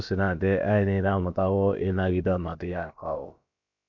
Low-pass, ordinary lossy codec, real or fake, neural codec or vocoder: 7.2 kHz; none; fake; codec, 16 kHz, about 1 kbps, DyCAST, with the encoder's durations